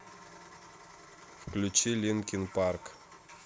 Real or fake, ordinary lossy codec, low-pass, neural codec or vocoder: real; none; none; none